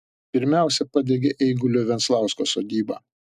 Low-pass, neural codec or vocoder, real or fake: 14.4 kHz; none; real